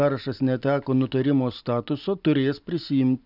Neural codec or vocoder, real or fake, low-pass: none; real; 5.4 kHz